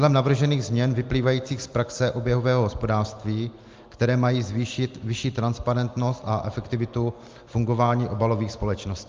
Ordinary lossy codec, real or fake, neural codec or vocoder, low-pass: Opus, 32 kbps; real; none; 7.2 kHz